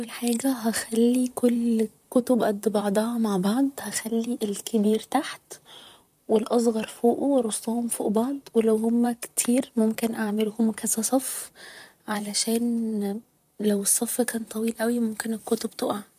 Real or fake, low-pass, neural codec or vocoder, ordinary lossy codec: fake; 14.4 kHz; vocoder, 44.1 kHz, 128 mel bands, Pupu-Vocoder; MP3, 96 kbps